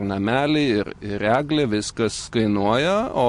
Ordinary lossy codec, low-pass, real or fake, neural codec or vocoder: MP3, 48 kbps; 14.4 kHz; real; none